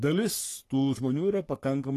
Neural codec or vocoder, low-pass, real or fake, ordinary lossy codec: codec, 44.1 kHz, 3.4 kbps, Pupu-Codec; 14.4 kHz; fake; AAC, 48 kbps